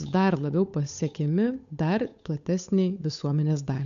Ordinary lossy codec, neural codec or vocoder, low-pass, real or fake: AAC, 96 kbps; codec, 16 kHz, 8 kbps, FunCodec, trained on Chinese and English, 25 frames a second; 7.2 kHz; fake